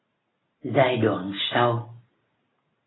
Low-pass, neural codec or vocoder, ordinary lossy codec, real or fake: 7.2 kHz; none; AAC, 16 kbps; real